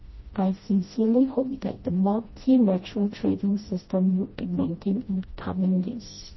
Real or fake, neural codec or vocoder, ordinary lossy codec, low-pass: fake; codec, 16 kHz, 1 kbps, FreqCodec, smaller model; MP3, 24 kbps; 7.2 kHz